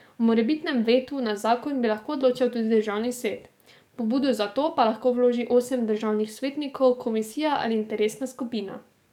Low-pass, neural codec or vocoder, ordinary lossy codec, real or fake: 19.8 kHz; codec, 44.1 kHz, 7.8 kbps, DAC; none; fake